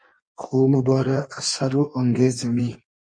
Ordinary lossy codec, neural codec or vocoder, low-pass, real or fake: MP3, 48 kbps; codec, 16 kHz in and 24 kHz out, 1.1 kbps, FireRedTTS-2 codec; 9.9 kHz; fake